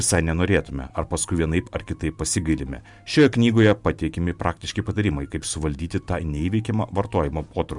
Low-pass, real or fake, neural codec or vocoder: 10.8 kHz; fake; vocoder, 44.1 kHz, 128 mel bands every 512 samples, BigVGAN v2